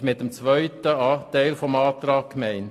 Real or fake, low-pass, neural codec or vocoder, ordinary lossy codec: real; 14.4 kHz; none; AAC, 48 kbps